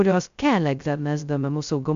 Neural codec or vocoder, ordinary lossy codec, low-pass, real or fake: codec, 16 kHz, 0.2 kbps, FocalCodec; MP3, 64 kbps; 7.2 kHz; fake